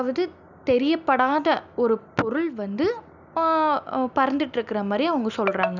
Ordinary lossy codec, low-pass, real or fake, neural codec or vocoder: none; 7.2 kHz; real; none